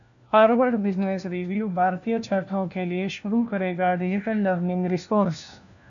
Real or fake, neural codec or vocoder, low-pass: fake; codec, 16 kHz, 1 kbps, FunCodec, trained on LibriTTS, 50 frames a second; 7.2 kHz